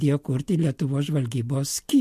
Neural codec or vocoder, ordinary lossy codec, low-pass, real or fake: none; MP3, 64 kbps; 14.4 kHz; real